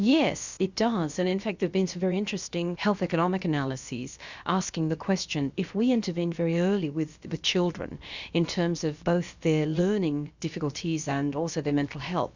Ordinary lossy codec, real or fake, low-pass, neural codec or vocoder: Opus, 64 kbps; fake; 7.2 kHz; codec, 16 kHz, about 1 kbps, DyCAST, with the encoder's durations